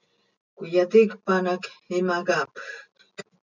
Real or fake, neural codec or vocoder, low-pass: real; none; 7.2 kHz